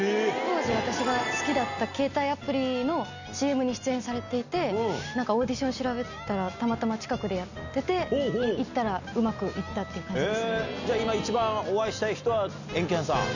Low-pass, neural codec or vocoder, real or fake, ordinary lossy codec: 7.2 kHz; none; real; none